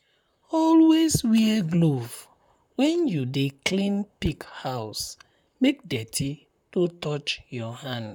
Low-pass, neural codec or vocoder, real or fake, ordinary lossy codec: 19.8 kHz; vocoder, 44.1 kHz, 128 mel bands, Pupu-Vocoder; fake; none